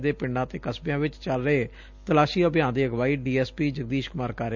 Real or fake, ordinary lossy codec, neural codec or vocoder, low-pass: real; none; none; 7.2 kHz